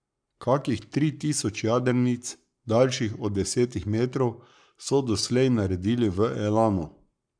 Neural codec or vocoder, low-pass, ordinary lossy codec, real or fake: codec, 44.1 kHz, 7.8 kbps, Pupu-Codec; 9.9 kHz; none; fake